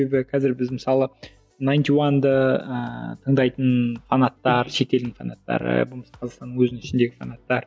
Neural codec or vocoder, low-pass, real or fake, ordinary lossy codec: none; none; real; none